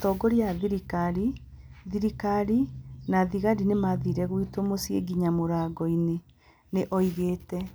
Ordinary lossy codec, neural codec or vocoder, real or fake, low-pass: none; none; real; none